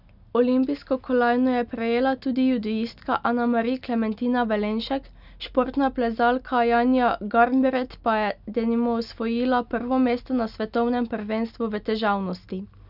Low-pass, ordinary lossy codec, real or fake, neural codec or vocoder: 5.4 kHz; none; real; none